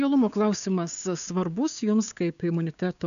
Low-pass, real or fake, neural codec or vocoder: 7.2 kHz; fake; codec, 16 kHz, 6 kbps, DAC